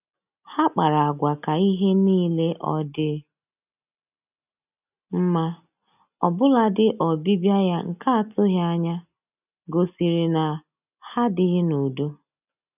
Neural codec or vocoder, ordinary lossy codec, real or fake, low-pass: none; none; real; 3.6 kHz